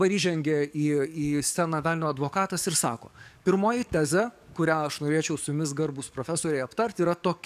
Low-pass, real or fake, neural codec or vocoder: 14.4 kHz; fake; codec, 44.1 kHz, 7.8 kbps, DAC